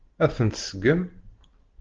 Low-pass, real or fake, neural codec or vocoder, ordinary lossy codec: 7.2 kHz; real; none; Opus, 16 kbps